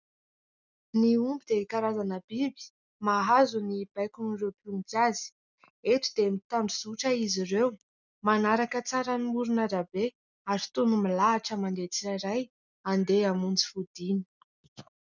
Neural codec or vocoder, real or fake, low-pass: none; real; 7.2 kHz